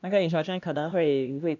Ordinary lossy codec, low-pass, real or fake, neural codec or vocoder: none; 7.2 kHz; fake; codec, 16 kHz, 1 kbps, X-Codec, HuBERT features, trained on LibriSpeech